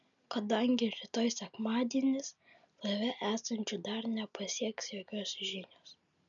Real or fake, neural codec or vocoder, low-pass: real; none; 7.2 kHz